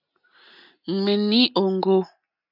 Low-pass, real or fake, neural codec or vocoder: 5.4 kHz; real; none